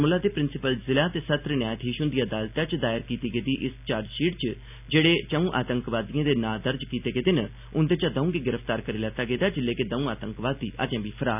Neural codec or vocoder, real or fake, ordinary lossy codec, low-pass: none; real; none; 3.6 kHz